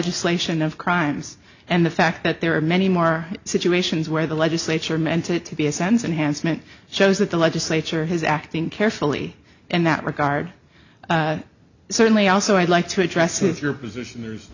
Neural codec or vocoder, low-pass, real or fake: none; 7.2 kHz; real